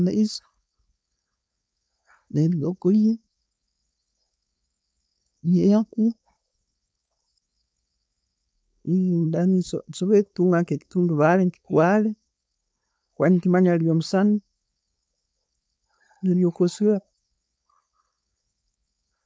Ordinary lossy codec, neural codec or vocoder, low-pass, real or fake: none; codec, 16 kHz, 4.8 kbps, FACodec; none; fake